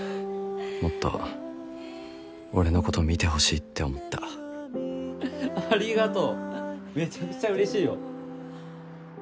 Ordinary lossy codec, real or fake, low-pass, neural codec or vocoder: none; real; none; none